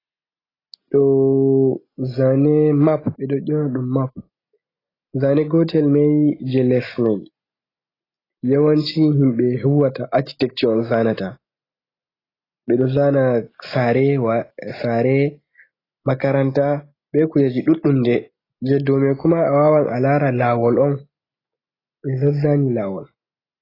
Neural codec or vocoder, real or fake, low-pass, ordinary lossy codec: none; real; 5.4 kHz; AAC, 24 kbps